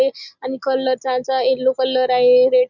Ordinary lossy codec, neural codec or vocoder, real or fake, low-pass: none; none; real; 7.2 kHz